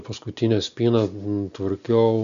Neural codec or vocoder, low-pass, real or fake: none; 7.2 kHz; real